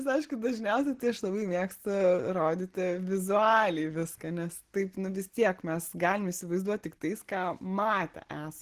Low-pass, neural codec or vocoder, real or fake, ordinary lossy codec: 14.4 kHz; none; real; Opus, 16 kbps